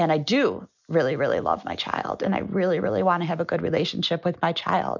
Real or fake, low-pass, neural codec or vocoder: real; 7.2 kHz; none